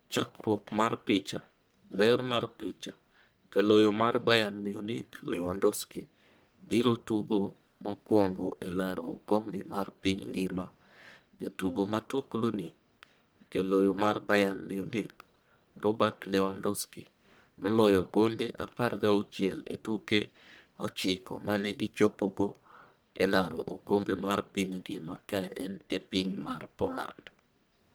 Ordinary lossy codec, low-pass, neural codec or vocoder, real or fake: none; none; codec, 44.1 kHz, 1.7 kbps, Pupu-Codec; fake